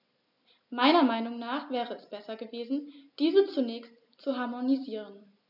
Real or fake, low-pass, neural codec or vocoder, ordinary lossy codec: real; 5.4 kHz; none; none